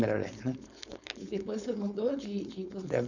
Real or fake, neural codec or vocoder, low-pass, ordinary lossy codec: fake; codec, 16 kHz, 4.8 kbps, FACodec; 7.2 kHz; none